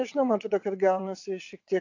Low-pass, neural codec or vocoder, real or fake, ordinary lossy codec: 7.2 kHz; vocoder, 22.05 kHz, 80 mel bands, WaveNeXt; fake; AAC, 48 kbps